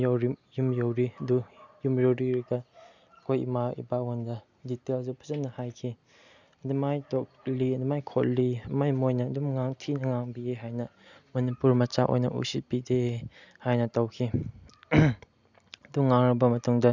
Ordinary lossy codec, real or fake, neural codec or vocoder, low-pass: none; real; none; 7.2 kHz